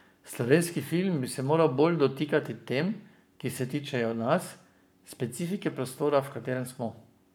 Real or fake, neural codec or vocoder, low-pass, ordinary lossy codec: fake; codec, 44.1 kHz, 7.8 kbps, Pupu-Codec; none; none